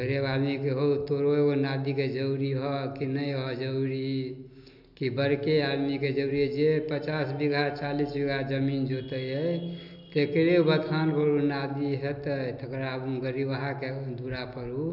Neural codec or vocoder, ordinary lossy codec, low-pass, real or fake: none; none; 5.4 kHz; real